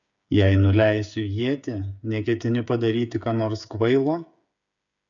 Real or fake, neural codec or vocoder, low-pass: fake; codec, 16 kHz, 8 kbps, FreqCodec, smaller model; 7.2 kHz